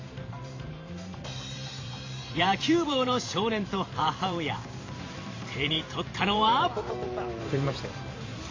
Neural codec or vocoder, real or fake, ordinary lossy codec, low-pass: vocoder, 44.1 kHz, 128 mel bands every 512 samples, BigVGAN v2; fake; AAC, 32 kbps; 7.2 kHz